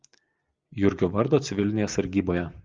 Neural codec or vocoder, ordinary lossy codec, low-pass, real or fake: none; Opus, 32 kbps; 7.2 kHz; real